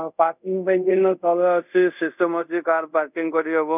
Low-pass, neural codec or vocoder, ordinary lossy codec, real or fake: 3.6 kHz; codec, 24 kHz, 0.5 kbps, DualCodec; none; fake